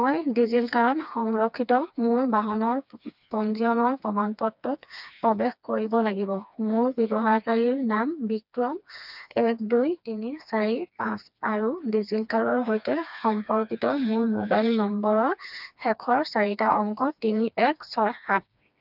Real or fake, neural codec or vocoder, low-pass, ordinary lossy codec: fake; codec, 16 kHz, 2 kbps, FreqCodec, smaller model; 5.4 kHz; none